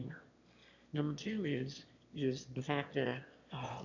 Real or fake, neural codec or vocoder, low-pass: fake; autoencoder, 22.05 kHz, a latent of 192 numbers a frame, VITS, trained on one speaker; 7.2 kHz